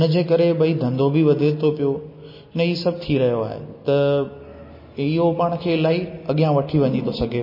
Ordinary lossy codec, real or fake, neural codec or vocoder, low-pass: MP3, 24 kbps; real; none; 5.4 kHz